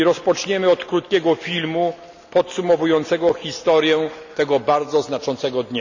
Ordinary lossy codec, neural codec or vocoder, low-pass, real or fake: none; none; 7.2 kHz; real